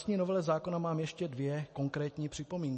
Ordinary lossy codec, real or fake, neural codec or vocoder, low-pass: MP3, 32 kbps; real; none; 10.8 kHz